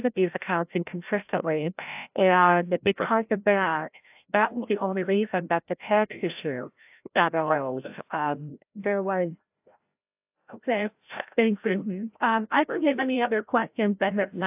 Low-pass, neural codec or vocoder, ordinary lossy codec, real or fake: 3.6 kHz; codec, 16 kHz, 0.5 kbps, FreqCodec, larger model; AAC, 32 kbps; fake